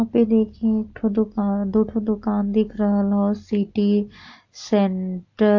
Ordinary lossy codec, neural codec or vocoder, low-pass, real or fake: AAC, 48 kbps; none; 7.2 kHz; real